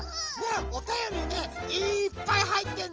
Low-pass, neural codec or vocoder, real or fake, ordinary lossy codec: 7.2 kHz; none; real; Opus, 24 kbps